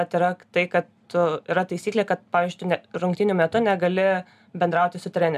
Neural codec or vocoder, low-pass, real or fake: none; 14.4 kHz; real